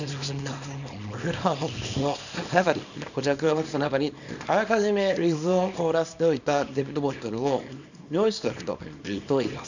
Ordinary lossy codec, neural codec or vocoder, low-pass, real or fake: none; codec, 24 kHz, 0.9 kbps, WavTokenizer, small release; 7.2 kHz; fake